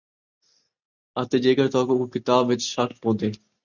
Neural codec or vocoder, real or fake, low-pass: none; real; 7.2 kHz